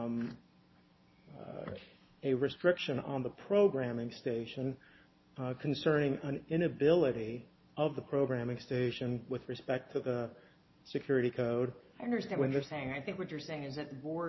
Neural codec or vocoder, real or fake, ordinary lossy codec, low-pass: none; real; MP3, 24 kbps; 7.2 kHz